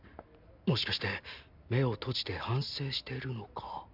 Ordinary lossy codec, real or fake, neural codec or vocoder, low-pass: none; real; none; 5.4 kHz